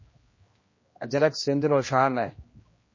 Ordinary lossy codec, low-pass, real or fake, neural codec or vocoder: MP3, 32 kbps; 7.2 kHz; fake; codec, 16 kHz, 1 kbps, X-Codec, HuBERT features, trained on general audio